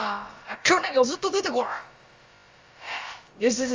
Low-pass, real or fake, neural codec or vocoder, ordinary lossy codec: 7.2 kHz; fake; codec, 16 kHz, about 1 kbps, DyCAST, with the encoder's durations; Opus, 32 kbps